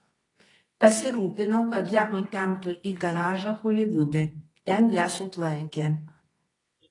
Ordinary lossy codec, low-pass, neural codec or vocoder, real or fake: AAC, 32 kbps; 10.8 kHz; codec, 24 kHz, 0.9 kbps, WavTokenizer, medium music audio release; fake